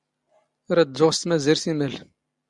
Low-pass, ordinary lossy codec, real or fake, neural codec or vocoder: 10.8 kHz; Opus, 64 kbps; real; none